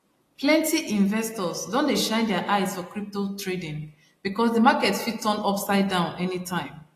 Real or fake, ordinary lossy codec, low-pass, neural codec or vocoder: real; AAC, 48 kbps; 14.4 kHz; none